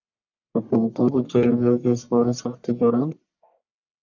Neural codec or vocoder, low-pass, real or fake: codec, 44.1 kHz, 1.7 kbps, Pupu-Codec; 7.2 kHz; fake